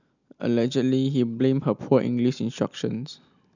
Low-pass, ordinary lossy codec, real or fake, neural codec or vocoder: 7.2 kHz; none; real; none